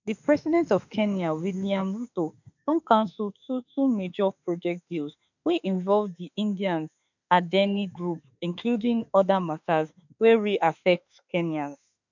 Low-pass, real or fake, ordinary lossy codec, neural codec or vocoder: 7.2 kHz; fake; none; autoencoder, 48 kHz, 32 numbers a frame, DAC-VAE, trained on Japanese speech